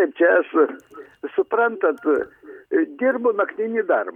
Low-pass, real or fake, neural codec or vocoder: 19.8 kHz; real; none